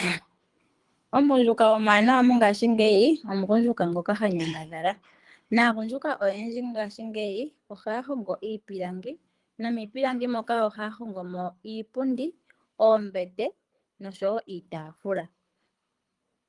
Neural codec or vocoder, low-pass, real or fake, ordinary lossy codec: codec, 24 kHz, 3 kbps, HILCodec; 10.8 kHz; fake; Opus, 32 kbps